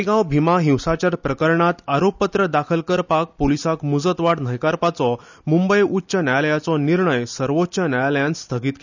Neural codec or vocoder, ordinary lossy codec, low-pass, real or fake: none; none; 7.2 kHz; real